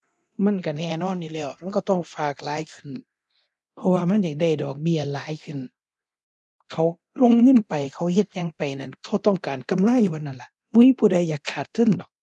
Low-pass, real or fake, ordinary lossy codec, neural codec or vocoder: none; fake; none; codec, 24 kHz, 0.9 kbps, DualCodec